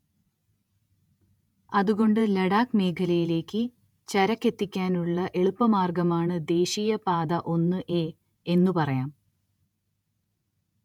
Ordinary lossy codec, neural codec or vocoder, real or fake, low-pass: none; vocoder, 48 kHz, 128 mel bands, Vocos; fake; 19.8 kHz